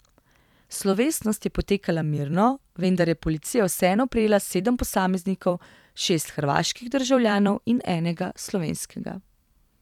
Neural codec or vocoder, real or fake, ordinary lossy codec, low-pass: vocoder, 44.1 kHz, 128 mel bands every 256 samples, BigVGAN v2; fake; none; 19.8 kHz